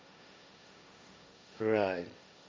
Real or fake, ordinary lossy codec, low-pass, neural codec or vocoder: fake; none; none; codec, 16 kHz, 1.1 kbps, Voila-Tokenizer